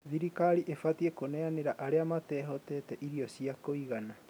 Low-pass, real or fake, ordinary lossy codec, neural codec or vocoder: none; real; none; none